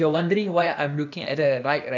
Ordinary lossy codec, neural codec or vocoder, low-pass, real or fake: none; codec, 16 kHz, 0.8 kbps, ZipCodec; 7.2 kHz; fake